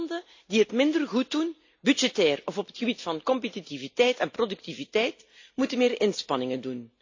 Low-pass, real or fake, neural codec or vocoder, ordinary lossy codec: 7.2 kHz; real; none; AAC, 48 kbps